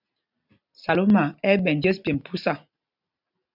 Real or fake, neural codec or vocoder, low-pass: real; none; 5.4 kHz